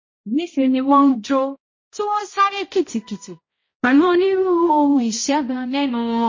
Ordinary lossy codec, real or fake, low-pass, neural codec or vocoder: MP3, 32 kbps; fake; 7.2 kHz; codec, 16 kHz, 0.5 kbps, X-Codec, HuBERT features, trained on balanced general audio